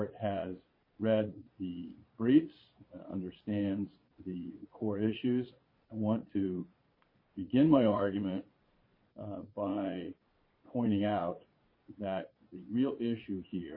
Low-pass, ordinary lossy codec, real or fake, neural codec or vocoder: 5.4 kHz; MP3, 24 kbps; fake; vocoder, 22.05 kHz, 80 mel bands, Vocos